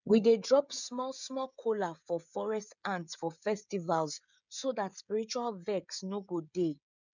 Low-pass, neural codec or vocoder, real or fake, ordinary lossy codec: 7.2 kHz; codec, 16 kHz, 16 kbps, FreqCodec, smaller model; fake; none